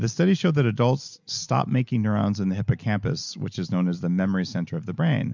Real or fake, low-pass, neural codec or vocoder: real; 7.2 kHz; none